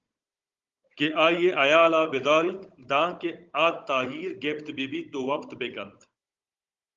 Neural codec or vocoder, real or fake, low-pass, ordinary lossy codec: codec, 16 kHz, 16 kbps, FunCodec, trained on Chinese and English, 50 frames a second; fake; 7.2 kHz; Opus, 32 kbps